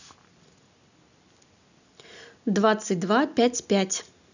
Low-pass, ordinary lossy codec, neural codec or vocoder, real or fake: 7.2 kHz; none; none; real